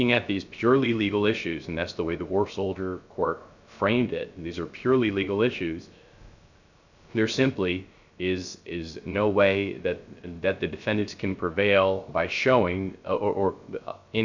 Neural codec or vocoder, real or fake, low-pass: codec, 16 kHz, 0.3 kbps, FocalCodec; fake; 7.2 kHz